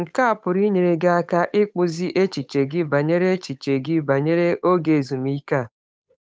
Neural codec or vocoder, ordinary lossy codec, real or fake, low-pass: codec, 16 kHz, 8 kbps, FunCodec, trained on Chinese and English, 25 frames a second; none; fake; none